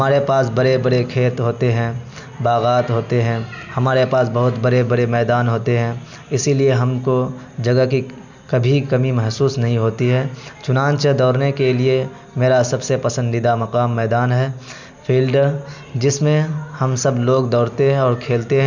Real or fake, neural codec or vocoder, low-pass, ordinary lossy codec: real; none; 7.2 kHz; none